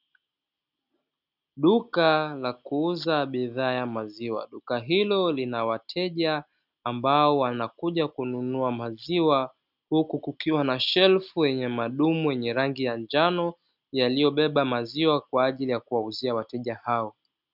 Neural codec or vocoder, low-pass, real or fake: none; 5.4 kHz; real